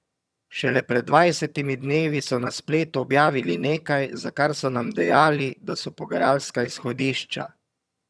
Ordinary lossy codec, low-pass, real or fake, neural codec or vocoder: none; none; fake; vocoder, 22.05 kHz, 80 mel bands, HiFi-GAN